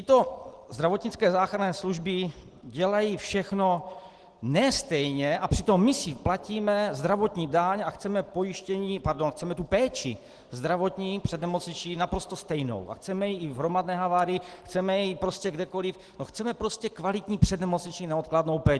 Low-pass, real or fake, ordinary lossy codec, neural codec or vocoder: 10.8 kHz; real; Opus, 16 kbps; none